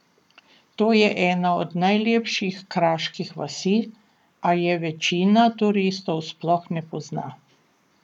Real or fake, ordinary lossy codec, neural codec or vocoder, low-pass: fake; none; codec, 44.1 kHz, 7.8 kbps, Pupu-Codec; 19.8 kHz